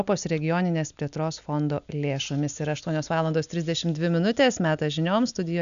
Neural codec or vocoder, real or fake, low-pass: none; real; 7.2 kHz